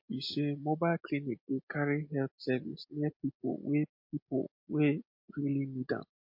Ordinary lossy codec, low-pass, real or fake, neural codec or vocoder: MP3, 32 kbps; 5.4 kHz; real; none